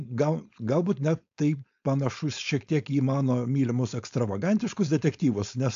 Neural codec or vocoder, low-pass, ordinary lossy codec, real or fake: codec, 16 kHz, 4.8 kbps, FACodec; 7.2 kHz; AAC, 64 kbps; fake